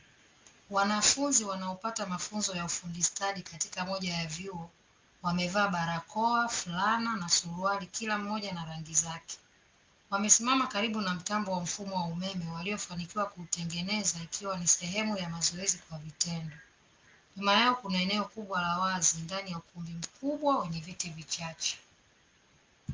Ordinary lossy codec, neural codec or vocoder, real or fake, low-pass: Opus, 32 kbps; none; real; 7.2 kHz